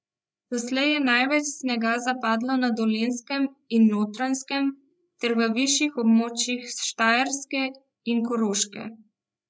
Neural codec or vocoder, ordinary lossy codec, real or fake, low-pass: codec, 16 kHz, 8 kbps, FreqCodec, larger model; none; fake; none